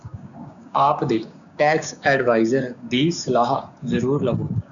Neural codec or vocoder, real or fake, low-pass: codec, 16 kHz, 6 kbps, DAC; fake; 7.2 kHz